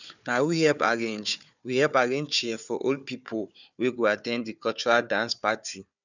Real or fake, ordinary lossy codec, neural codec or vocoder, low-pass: fake; none; codec, 16 kHz, 4 kbps, FunCodec, trained on Chinese and English, 50 frames a second; 7.2 kHz